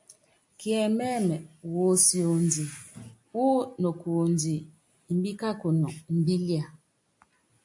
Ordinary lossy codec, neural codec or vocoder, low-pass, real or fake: AAC, 64 kbps; none; 10.8 kHz; real